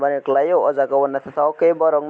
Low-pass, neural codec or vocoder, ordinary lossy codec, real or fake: none; none; none; real